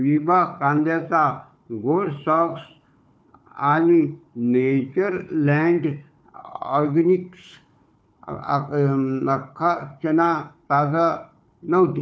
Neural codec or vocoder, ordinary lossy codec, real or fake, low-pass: codec, 16 kHz, 4 kbps, FunCodec, trained on Chinese and English, 50 frames a second; none; fake; none